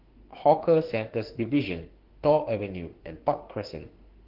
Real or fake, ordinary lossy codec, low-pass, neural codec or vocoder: fake; Opus, 16 kbps; 5.4 kHz; autoencoder, 48 kHz, 32 numbers a frame, DAC-VAE, trained on Japanese speech